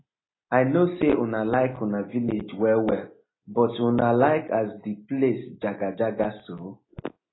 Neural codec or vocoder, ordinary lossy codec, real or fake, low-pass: none; AAC, 16 kbps; real; 7.2 kHz